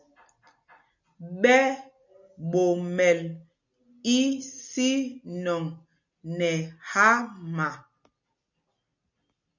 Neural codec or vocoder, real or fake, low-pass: none; real; 7.2 kHz